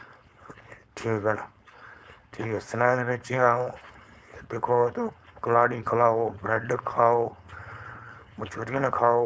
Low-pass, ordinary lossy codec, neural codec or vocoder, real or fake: none; none; codec, 16 kHz, 4.8 kbps, FACodec; fake